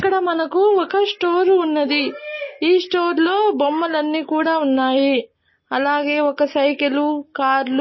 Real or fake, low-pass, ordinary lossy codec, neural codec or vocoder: real; 7.2 kHz; MP3, 24 kbps; none